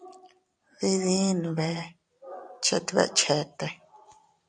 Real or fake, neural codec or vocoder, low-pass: fake; vocoder, 44.1 kHz, 128 mel bands every 256 samples, BigVGAN v2; 9.9 kHz